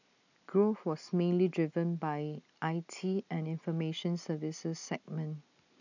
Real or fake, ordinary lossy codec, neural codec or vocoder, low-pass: real; none; none; 7.2 kHz